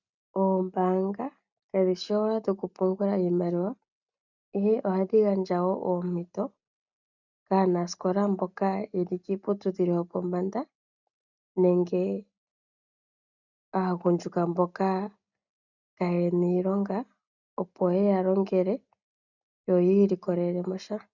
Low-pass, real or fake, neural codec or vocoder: 7.2 kHz; real; none